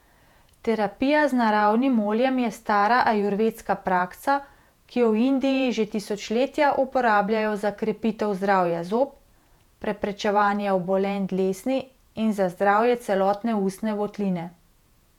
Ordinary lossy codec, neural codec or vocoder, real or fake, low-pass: none; vocoder, 48 kHz, 128 mel bands, Vocos; fake; 19.8 kHz